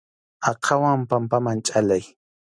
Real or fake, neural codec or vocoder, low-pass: real; none; 9.9 kHz